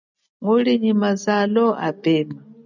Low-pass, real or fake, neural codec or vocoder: 7.2 kHz; real; none